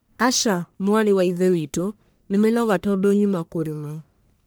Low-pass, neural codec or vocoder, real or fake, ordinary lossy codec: none; codec, 44.1 kHz, 1.7 kbps, Pupu-Codec; fake; none